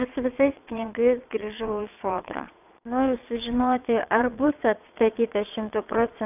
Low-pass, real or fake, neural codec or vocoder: 3.6 kHz; fake; vocoder, 22.05 kHz, 80 mel bands, Vocos